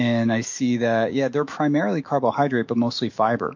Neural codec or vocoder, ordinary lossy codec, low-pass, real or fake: autoencoder, 48 kHz, 128 numbers a frame, DAC-VAE, trained on Japanese speech; MP3, 48 kbps; 7.2 kHz; fake